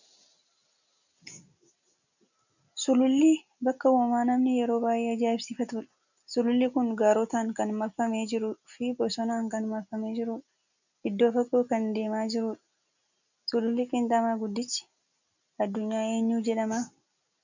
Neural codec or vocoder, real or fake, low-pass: none; real; 7.2 kHz